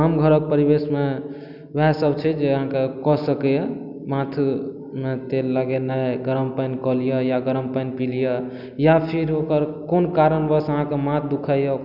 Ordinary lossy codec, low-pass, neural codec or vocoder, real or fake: none; 5.4 kHz; none; real